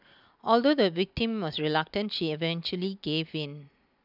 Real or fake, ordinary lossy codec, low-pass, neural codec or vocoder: real; none; 5.4 kHz; none